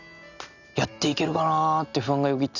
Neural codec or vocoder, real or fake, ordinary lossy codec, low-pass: none; real; none; 7.2 kHz